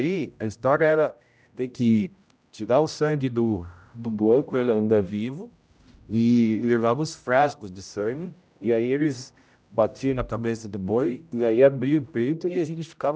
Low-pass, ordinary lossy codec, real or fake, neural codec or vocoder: none; none; fake; codec, 16 kHz, 0.5 kbps, X-Codec, HuBERT features, trained on general audio